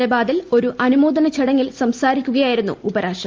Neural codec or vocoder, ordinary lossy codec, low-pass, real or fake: none; Opus, 32 kbps; 7.2 kHz; real